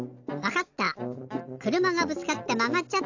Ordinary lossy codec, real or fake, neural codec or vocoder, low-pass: AAC, 48 kbps; real; none; 7.2 kHz